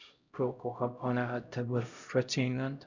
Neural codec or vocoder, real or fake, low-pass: codec, 16 kHz, 0.5 kbps, X-Codec, HuBERT features, trained on LibriSpeech; fake; 7.2 kHz